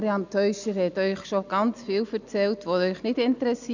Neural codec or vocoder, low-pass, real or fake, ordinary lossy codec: none; 7.2 kHz; real; none